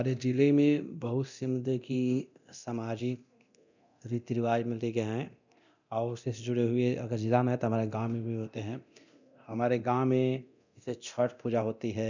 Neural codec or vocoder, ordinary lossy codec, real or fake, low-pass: codec, 24 kHz, 0.9 kbps, DualCodec; none; fake; 7.2 kHz